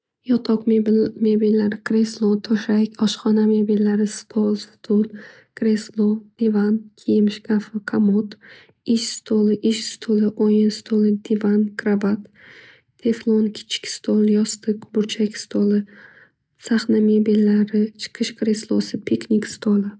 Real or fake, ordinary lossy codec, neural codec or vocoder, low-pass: real; none; none; none